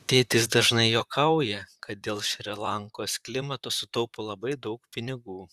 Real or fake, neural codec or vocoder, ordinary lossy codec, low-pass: fake; vocoder, 44.1 kHz, 128 mel bands, Pupu-Vocoder; Opus, 64 kbps; 14.4 kHz